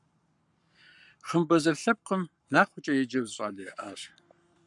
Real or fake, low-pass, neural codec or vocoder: fake; 10.8 kHz; codec, 44.1 kHz, 7.8 kbps, Pupu-Codec